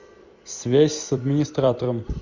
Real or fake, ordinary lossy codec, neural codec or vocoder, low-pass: fake; Opus, 64 kbps; vocoder, 44.1 kHz, 128 mel bands every 256 samples, BigVGAN v2; 7.2 kHz